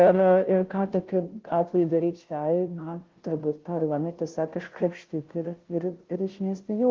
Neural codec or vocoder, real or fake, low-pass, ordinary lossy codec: codec, 16 kHz, 0.5 kbps, FunCodec, trained on Chinese and English, 25 frames a second; fake; 7.2 kHz; Opus, 16 kbps